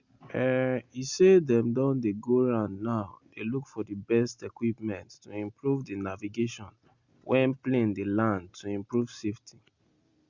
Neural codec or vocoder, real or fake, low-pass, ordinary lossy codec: none; real; 7.2 kHz; Opus, 64 kbps